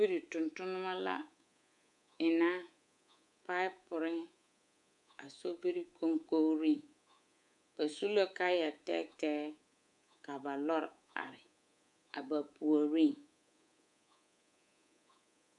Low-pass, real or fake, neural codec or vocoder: 10.8 kHz; fake; codec, 24 kHz, 3.1 kbps, DualCodec